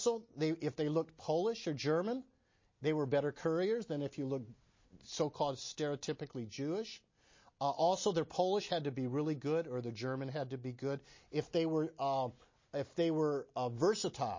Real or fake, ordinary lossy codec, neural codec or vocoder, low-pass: real; MP3, 32 kbps; none; 7.2 kHz